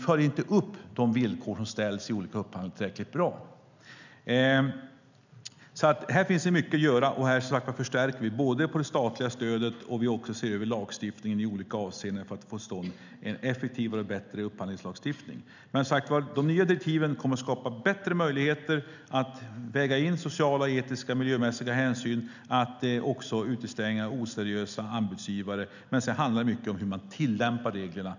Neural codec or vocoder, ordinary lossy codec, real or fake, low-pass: none; none; real; 7.2 kHz